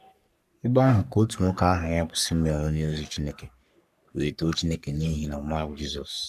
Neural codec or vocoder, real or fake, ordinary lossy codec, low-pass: codec, 44.1 kHz, 3.4 kbps, Pupu-Codec; fake; AAC, 96 kbps; 14.4 kHz